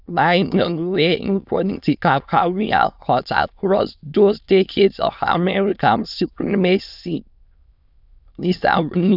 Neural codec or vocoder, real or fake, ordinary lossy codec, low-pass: autoencoder, 22.05 kHz, a latent of 192 numbers a frame, VITS, trained on many speakers; fake; none; 5.4 kHz